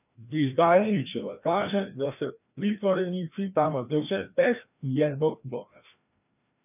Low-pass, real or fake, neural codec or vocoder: 3.6 kHz; fake; codec, 16 kHz, 1 kbps, FreqCodec, larger model